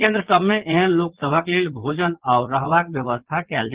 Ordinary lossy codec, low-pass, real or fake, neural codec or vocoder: Opus, 16 kbps; 3.6 kHz; fake; vocoder, 22.05 kHz, 80 mel bands, Vocos